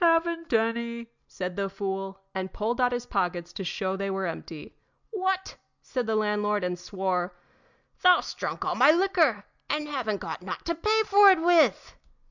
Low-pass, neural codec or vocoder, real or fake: 7.2 kHz; none; real